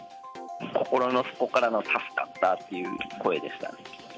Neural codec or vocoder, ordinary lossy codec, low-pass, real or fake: none; none; none; real